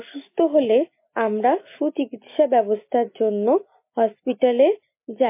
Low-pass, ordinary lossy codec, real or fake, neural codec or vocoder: 3.6 kHz; MP3, 24 kbps; real; none